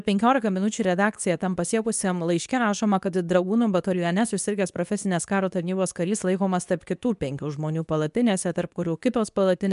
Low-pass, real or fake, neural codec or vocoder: 10.8 kHz; fake; codec, 24 kHz, 0.9 kbps, WavTokenizer, medium speech release version 2